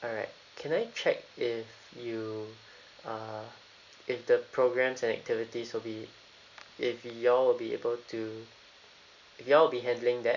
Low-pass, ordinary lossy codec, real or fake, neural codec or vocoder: 7.2 kHz; none; real; none